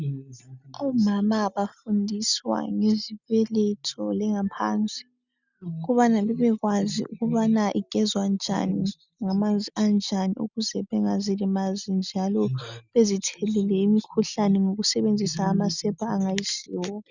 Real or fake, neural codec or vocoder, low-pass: real; none; 7.2 kHz